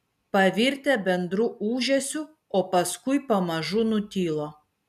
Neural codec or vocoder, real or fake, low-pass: none; real; 14.4 kHz